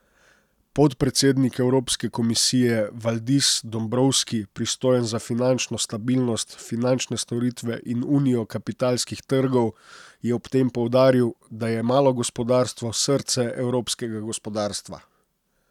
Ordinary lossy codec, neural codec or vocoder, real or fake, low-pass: none; none; real; 19.8 kHz